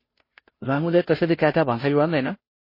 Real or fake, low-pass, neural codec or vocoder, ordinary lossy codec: fake; 5.4 kHz; codec, 16 kHz, 0.5 kbps, FunCodec, trained on Chinese and English, 25 frames a second; MP3, 24 kbps